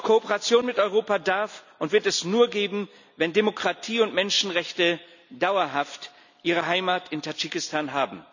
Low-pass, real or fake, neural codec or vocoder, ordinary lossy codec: 7.2 kHz; real; none; none